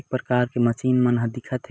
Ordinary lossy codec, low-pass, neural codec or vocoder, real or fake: none; none; none; real